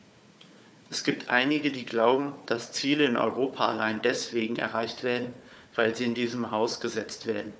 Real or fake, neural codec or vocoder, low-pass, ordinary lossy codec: fake; codec, 16 kHz, 4 kbps, FunCodec, trained on Chinese and English, 50 frames a second; none; none